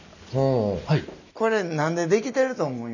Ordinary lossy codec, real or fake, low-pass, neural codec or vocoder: none; real; 7.2 kHz; none